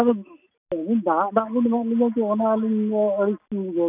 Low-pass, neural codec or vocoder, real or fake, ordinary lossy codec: 3.6 kHz; none; real; none